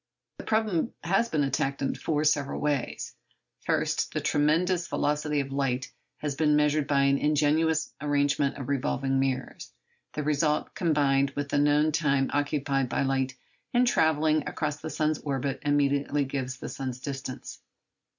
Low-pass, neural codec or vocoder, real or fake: 7.2 kHz; none; real